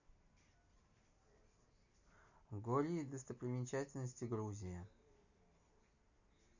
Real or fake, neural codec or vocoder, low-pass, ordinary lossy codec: real; none; 7.2 kHz; none